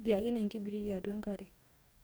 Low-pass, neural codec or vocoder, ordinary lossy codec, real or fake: none; codec, 44.1 kHz, 2.6 kbps, DAC; none; fake